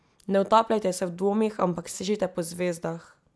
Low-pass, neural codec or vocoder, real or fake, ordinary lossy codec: none; none; real; none